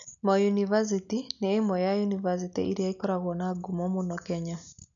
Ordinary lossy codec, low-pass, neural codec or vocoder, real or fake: none; 7.2 kHz; none; real